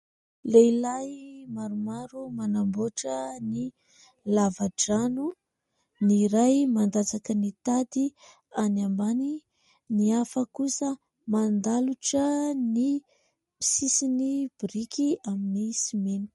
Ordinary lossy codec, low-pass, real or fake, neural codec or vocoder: MP3, 48 kbps; 19.8 kHz; real; none